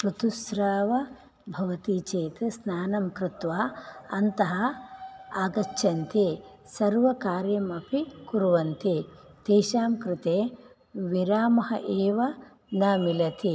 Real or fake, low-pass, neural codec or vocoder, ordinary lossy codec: real; none; none; none